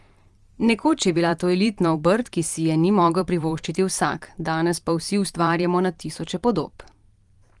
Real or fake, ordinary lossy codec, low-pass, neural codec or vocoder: fake; Opus, 24 kbps; 10.8 kHz; vocoder, 44.1 kHz, 128 mel bands every 512 samples, BigVGAN v2